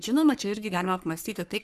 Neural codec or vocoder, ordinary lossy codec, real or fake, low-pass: codec, 44.1 kHz, 3.4 kbps, Pupu-Codec; Opus, 64 kbps; fake; 14.4 kHz